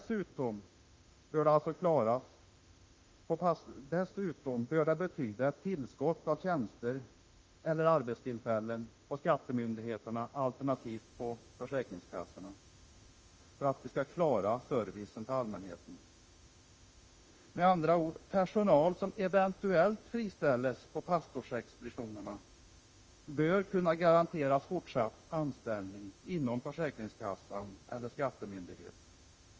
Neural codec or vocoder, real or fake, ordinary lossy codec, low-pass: autoencoder, 48 kHz, 32 numbers a frame, DAC-VAE, trained on Japanese speech; fake; Opus, 24 kbps; 7.2 kHz